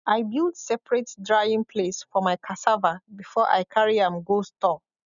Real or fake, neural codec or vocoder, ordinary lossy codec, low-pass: real; none; none; 7.2 kHz